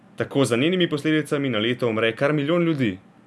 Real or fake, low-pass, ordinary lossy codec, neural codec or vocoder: real; none; none; none